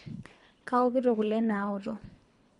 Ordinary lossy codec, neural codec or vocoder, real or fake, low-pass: MP3, 64 kbps; codec, 24 kHz, 3 kbps, HILCodec; fake; 10.8 kHz